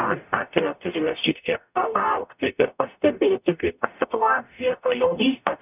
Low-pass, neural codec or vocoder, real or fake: 3.6 kHz; codec, 44.1 kHz, 0.9 kbps, DAC; fake